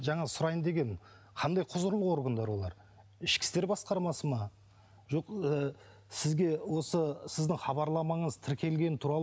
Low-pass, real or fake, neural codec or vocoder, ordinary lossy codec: none; real; none; none